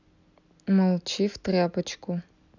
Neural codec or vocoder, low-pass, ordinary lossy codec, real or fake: none; 7.2 kHz; none; real